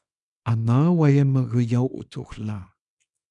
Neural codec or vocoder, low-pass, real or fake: codec, 24 kHz, 0.9 kbps, WavTokenizer, small release; 10.8 kHz; fake